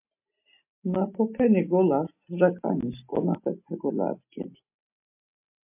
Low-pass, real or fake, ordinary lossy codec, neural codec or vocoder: 3.6 kHz; real; MP3, 32 kbps; none